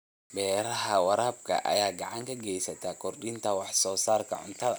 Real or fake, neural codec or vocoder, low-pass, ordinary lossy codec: real; none; none; none